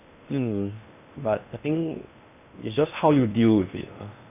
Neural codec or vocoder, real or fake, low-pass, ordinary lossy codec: codec, 16 kHz in and 24 kHz out, 0.8 kbps, FocalCodec, streaming, 65536 codes; fake; 3.6 kHz; none